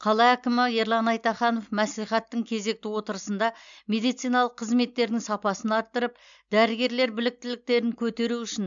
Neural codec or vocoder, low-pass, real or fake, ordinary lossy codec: none; 7.2 kHz; real; MP3, 64 kbps